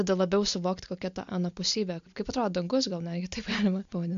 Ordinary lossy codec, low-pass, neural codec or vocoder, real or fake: MP3, 64 kbps; 7.2 kHz; none; real